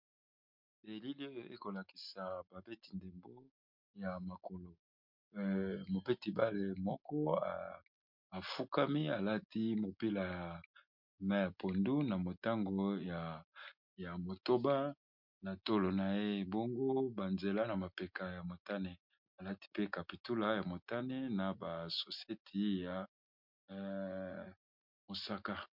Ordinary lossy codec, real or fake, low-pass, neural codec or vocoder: MP3, 48 kbps; real; 5.4 kHz; none